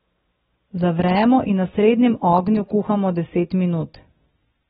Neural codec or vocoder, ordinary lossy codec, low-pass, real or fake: none; AAC, 16 kbps; 19.8 kHz; real